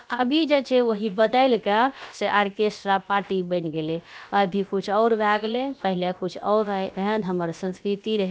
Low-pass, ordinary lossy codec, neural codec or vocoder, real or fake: none; none; codec, 16 kHz, about 1 kbps, DyCAST, with the encoder's durations; fake